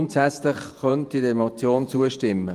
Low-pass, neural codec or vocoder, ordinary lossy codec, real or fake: 14.4 kHz; vocoder, 48 kHz, 128 mel bands, Vocos; Opus, 32 kbps; fake